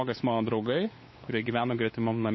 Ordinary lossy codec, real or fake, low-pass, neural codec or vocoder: MP3, 24 kbps; fake; 7.2 kHz; codec, 16 kHz, 8 kbps, FunCodec, trained on LibriTTS, 25 frames a second